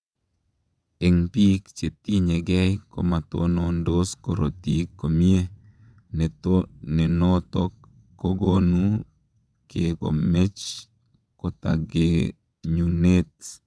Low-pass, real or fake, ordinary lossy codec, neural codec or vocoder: none; fake; none; vocoder, 22.05 kHz, 80 mel bands, WaveNeXt